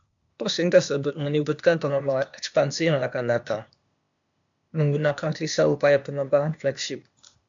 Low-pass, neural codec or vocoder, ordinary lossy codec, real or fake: 7.2 kHz; codec, 16 kHz, 0.8 kbps, ZipCodec; MP3, 64 kbps; fake